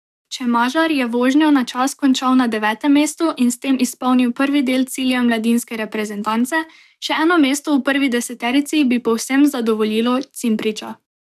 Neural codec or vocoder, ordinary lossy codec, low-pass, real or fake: codec, 44.1 kHz, 7.8 kbps, DAC; none; 14.4 kHz; fake